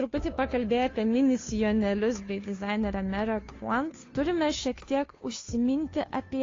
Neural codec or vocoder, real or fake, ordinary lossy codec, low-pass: codec, 16 kHz, 2 kbps, FunCodec, trained on Chinese and English, 25 frames a second; fake; AAC, 32 kbps; 7.2 kHz